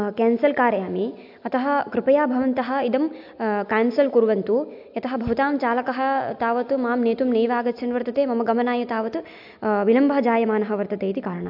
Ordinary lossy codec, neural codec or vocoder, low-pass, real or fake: AAC, 48 kbps; none; 5.4 kHz; real